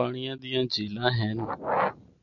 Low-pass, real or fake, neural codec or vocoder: 7.2 kHz; real; none